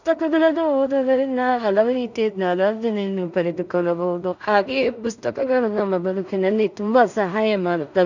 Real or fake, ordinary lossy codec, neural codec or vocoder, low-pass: fake; none; codec, 16 kHz in and 24 kHz out, 0.4 kbps, LongCat-Audio-Codec, two codebook decoder; 7.2 kHz